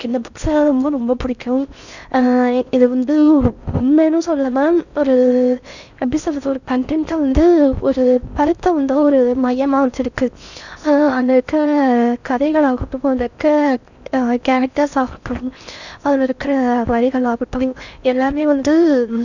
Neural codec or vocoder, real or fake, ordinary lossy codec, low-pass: codec, 16 kHz in and 24 kHz out, 0.6 kbps, FocalCodec, streaming, 4096 codes; fake; none; 7.2 kHz